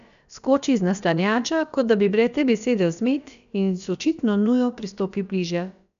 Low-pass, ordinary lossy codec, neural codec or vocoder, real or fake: 7.2 kHz; none; codec, 16 kHz, about 1 kbps, DyCAST, with the encoder's durations; fake